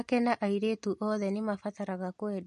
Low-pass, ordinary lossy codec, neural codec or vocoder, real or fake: 14.4 kHz; MP3, 48 kbps; none; real